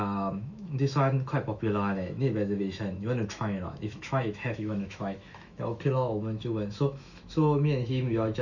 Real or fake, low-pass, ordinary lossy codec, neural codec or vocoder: fake; 7.2 kHz; none; autoencoder, 48 kHz, 128 numbers a frame, DAC-VAE, trained on Japanese speech